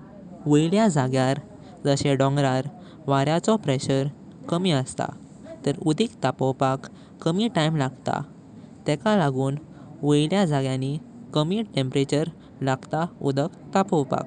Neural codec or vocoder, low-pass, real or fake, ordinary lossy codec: none; 10.8 kHz; real; none